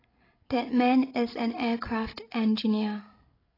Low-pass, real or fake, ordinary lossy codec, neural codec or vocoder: 5.4 kHz; fake; AAC, 24 kbps; codec, 16 kHz, 16 kbps, FreqCodec, larger model